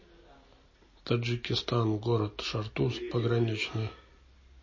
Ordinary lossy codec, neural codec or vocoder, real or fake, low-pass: MP3, 32 kbps; none; real; 7.2 kHz